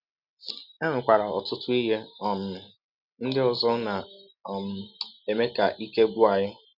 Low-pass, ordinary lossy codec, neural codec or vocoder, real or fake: 5.4 kHz; none; none; real